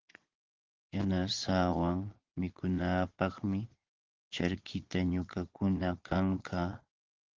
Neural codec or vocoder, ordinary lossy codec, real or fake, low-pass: vocoder, 22.05 kHz, 80 mel bands, Vocos; Opus, 16 kbps; fake; 7.2 kHz